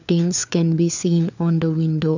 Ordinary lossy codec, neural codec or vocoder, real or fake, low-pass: none; codec, 16 kHz, 4 kbps, FunCodec, trained on LibriTTS, 50 frames a second; fake; 7.2 kHz